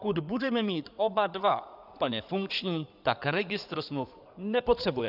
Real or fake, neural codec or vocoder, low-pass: fake; codec, 16 kHz in and 24 kHz out, 2.2 kbps, FireRedTTS-2 codec; 5.4 kHz